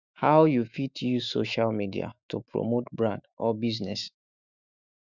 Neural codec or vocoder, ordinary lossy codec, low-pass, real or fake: autoencoder, 48 kHz, 128 numbers a frame, DAC-VAE, trained on Japanese speech; Opus, 64 kbps; 7.2 kHz; fake